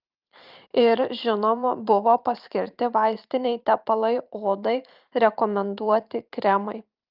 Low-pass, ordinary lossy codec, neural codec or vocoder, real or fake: 5.4 kHz; Opus, 24 kbps; vocoder, 44.1 kHz, 128 mel bands every 512 samples, BigVGAN v2; fake